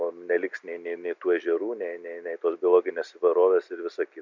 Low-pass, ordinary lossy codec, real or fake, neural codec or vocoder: 7.2 kHz; MP3, 64 kbps; real; none